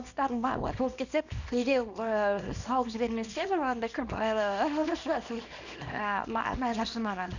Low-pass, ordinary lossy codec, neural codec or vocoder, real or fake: 7.2 kHz; none; codec, 24 kHz, 0.9 kbps, WavTokenizer, small release; fake